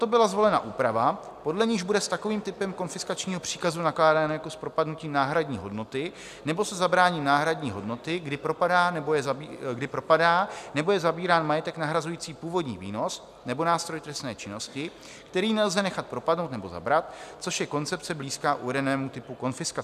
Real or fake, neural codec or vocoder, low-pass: real; none; 14.4 kHz